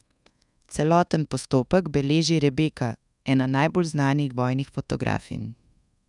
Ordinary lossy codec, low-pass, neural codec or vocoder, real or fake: none; 10.8 kHz; codec, 24 kHz, 1.2 kbps, DualCodec; fake